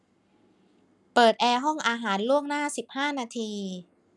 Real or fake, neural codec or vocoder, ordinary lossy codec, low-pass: real; none; none; none